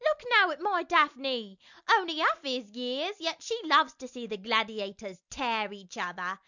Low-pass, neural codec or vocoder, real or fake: 7.2 kHz; none; real